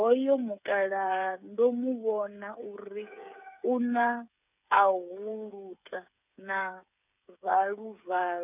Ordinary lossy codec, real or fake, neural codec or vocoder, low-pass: none; fake; codec, 16 kHz, 8 kbps, FreqCodec, smaller model; 3.6 kHz